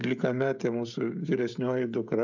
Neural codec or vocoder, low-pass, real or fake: codec, 16 kHz, 16 kbps, FreqCodec, smaller model; 7.2 kHz; fake